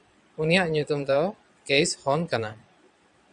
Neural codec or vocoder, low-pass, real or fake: vocoder, 22.05 kHz, 80 mel bands, Vocos; 9.9 kHz; fake